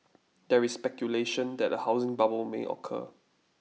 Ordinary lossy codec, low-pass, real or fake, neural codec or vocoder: none; none; real; none